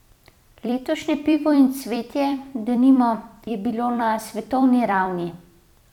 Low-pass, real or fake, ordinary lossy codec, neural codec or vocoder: 19.8 kHz; fake; none; vocoder, 44.1 kHz, 128 mel bands every 256 samples, BigVGAN v2